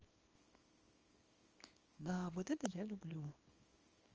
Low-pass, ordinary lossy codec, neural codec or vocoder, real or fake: 7.2 kHz; Opus, 24 kbps; codec, 24 kHz, 0.9 kbps, WavTokenizer, small release; fake